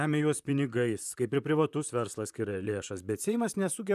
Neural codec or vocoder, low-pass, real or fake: vocoder, 44.1 kHz, 128 mel bands, Pupu-Vocoder; 14.4 kHz; fake